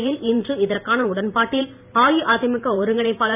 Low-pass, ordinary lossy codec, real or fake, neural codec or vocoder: 3.6 kHz; none; fake; vocoder, 44.1 kHz, 128 mel bands every 512 samples, BigVGAN v2